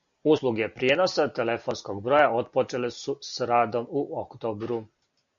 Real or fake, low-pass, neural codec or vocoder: real; 7.2 kHz; none